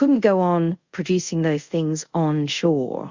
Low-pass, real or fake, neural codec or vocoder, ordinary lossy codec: 7.2 kHz; fake; codec, 24 kHz, 0.5 kbps, DualCodec; Opus, 64 kbps